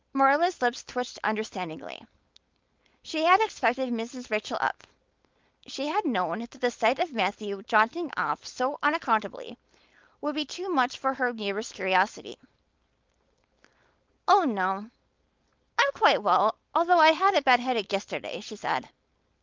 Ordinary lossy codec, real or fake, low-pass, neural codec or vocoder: Opus, 32 kbps; fake; 7.2 kHz; codec, 16 kHz, 4.8 kbps, FACodec